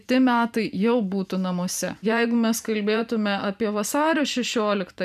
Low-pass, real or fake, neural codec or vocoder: 14.4 kHz; fake; vocoder, 44.1 kHz, 128 mel bands every 512 samples, BigVGAN v2